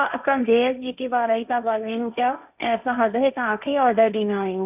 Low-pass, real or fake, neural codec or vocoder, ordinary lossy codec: 3.6 kHz; fake; codec, 16 kHz, 1.1 kbps, Voila-Tokenizer; none